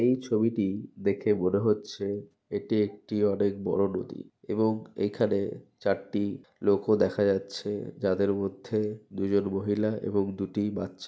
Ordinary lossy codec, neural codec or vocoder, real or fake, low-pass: none; none; real; none